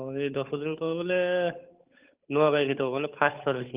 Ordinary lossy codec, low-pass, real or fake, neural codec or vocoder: Opus, 24 kbps; 3.6 kHz; fake; codec, 16 kHz, 8 kbps, FunCodec, trained on Chinese and English, 25 frames a second